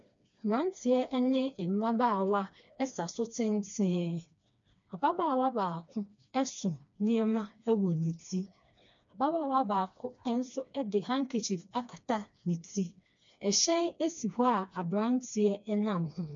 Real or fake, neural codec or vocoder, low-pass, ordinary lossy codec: fake; codec, 16 kHz, 2 kbps, FreqCodec, smaller model; 7.2 kHz; MP3, 64 kbps